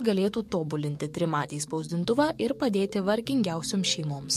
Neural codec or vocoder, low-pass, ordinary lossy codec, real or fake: codec, 44.1 kHz, 7.8 kbps, DAC; 14.4 kHz; MP3, 64 kbps; fake